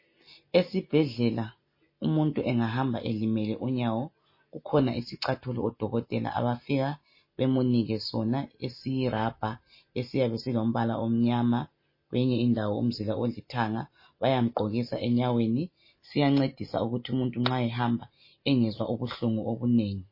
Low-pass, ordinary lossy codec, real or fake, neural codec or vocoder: 5.4 kHz; MP3, 24 kbps; real; none